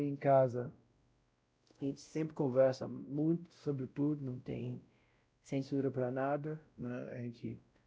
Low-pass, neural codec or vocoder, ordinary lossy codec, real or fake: none; codec, 16 kHz, 0.5 kbps, X-Codec, WavLM features, trained on Multilingual LibriSpeech; none; fake